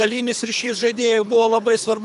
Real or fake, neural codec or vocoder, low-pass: fake; codec, 24 kHz, 3 kbps, HILCodec; 10.8 kHz